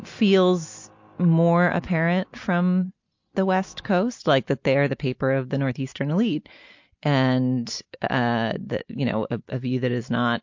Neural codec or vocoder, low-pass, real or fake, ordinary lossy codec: none; 7.2 kHz; real; MP3, 48 kbps